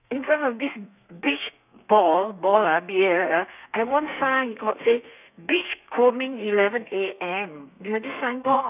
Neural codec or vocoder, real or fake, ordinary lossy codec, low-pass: codec, 32 kHz, 1.9 kbps, SNAC; fake; none; 3.6 kHz